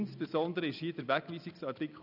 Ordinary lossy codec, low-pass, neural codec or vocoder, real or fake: none; 5.4 kHz; none; real